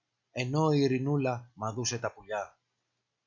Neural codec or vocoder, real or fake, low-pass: none; real; 7.2 kHz